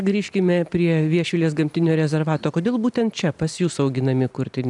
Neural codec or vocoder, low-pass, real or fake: none; 10.8 kHz; real